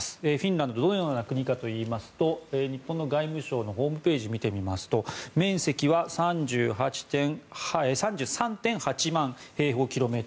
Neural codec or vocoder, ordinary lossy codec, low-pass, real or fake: none; none; none; real